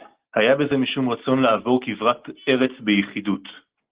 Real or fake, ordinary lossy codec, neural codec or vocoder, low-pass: real; Opus, 16 kbps; none; 3.6 kHz